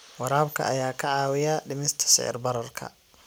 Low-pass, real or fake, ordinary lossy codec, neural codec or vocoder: none; real; none; none